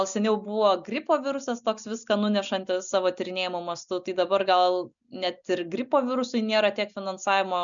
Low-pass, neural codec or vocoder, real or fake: 7.2 kHz; none; real